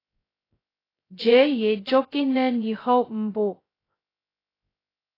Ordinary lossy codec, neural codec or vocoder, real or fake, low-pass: AAC, 24 kbps; codec, 16 kHz, 0.2 kbps, FocalCodec; fake; 5.4 kHz